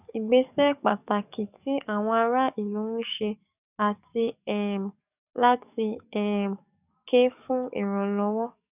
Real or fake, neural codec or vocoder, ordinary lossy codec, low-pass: fake; codec, 44.1 kHz, 7.8 kbps, DAC; none; 3.6 kHz